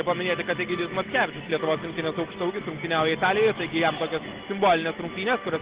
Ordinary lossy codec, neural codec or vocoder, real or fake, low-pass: Opus, 16 kbps; none; real; 3.6 kHz